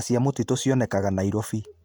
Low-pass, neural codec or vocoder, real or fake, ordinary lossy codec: none; none; real; none